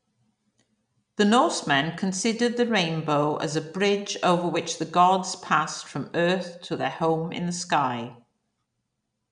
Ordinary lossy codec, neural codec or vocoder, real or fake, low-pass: none; none; real; 9.9 kHz